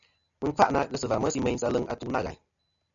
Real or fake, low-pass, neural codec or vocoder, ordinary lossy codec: real; 7.2 kHz; none; MP3, 96 kbps